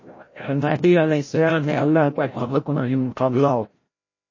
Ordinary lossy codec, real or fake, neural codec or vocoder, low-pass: MP3, 32 kbps; fake; codec, 16 kHz, 0.5 kbps, FreqCodec, larger model; 7.2 kHz